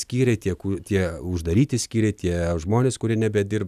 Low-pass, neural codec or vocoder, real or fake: 14.4 kHz; none; real